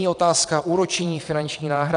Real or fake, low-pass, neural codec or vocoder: fake; 9.9 kHz; vocoder, 22.05 kHz, 80 mel bands, Vocos